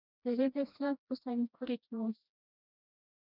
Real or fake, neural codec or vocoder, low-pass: fake; codec, 16 kHz, 1 kbps, FreqCodec, smaller model; 5.4 kHz